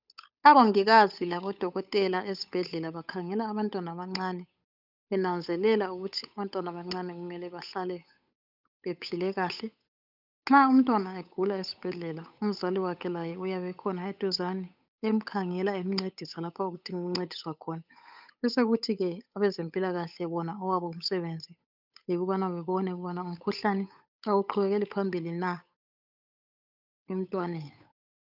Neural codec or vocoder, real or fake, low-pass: codec, 16 kHz, 8 kbps, FunCodec, trained on Chinese and English, 25 frames a second; fake; 5.4 kHz